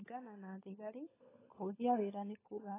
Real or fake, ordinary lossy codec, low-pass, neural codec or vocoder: fake; AAC, 16 kbps; 3.6 kHz; codec, 16 kHz, 16 kbps, FunCodec, trained on LibriTTS, 50 frames a second